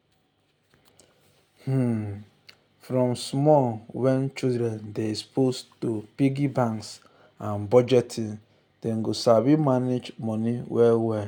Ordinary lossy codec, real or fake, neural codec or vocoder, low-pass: none; real; none; 19.8 kHz